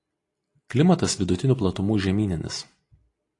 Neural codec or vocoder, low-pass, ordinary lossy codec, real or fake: none; 10.8 kHz; AAC, 48 kbps; real